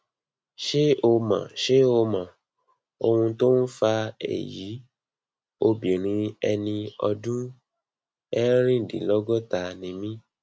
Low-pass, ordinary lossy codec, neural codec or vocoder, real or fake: none; none; none; real